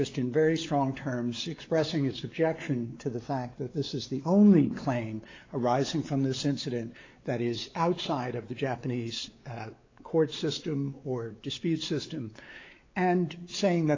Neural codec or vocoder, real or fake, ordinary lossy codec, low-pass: codec, 16 kHz, 4 kbps, X-Codec, WavLM features, trained on Multilingual LibriSpeech; fake; AAC, 32 kbps; 7.2 kHz